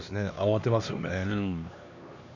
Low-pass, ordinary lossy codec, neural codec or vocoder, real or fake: 7.2 kHz; none; codec, 16 kHz, 2 kbps, X-Codec, HuBERT features, trained on LibriSpeech; fake